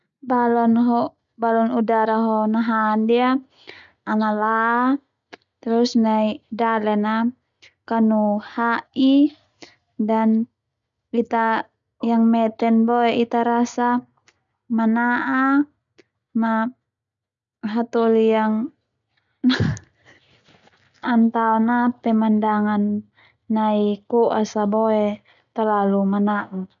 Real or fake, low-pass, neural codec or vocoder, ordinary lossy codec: real; 7.2 kHz; none; none